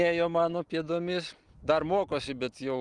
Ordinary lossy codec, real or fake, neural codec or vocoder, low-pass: Opus, 32 kbps; real; none; 10.8 kHz